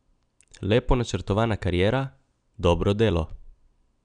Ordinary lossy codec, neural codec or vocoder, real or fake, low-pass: none; none; real; 9.9 kHz